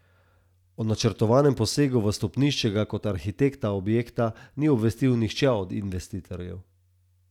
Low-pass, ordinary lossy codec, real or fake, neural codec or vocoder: 19.8 kHz; none; real; none